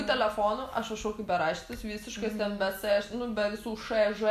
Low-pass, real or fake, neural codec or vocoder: 14.4 kHz; real; none